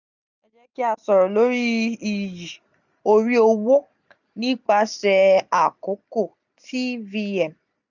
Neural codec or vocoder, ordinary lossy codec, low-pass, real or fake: none; none; 7.2 kHz; real